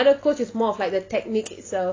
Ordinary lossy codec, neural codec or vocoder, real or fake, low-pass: AAC, 32 kbps; none; real; 7.2 kHz